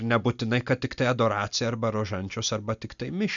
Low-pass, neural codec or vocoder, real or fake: 7.2 kHz; none; real